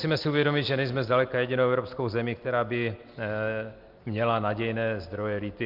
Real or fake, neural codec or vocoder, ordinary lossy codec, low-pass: real; none; Opus, 32 kbps; 5.4 kHz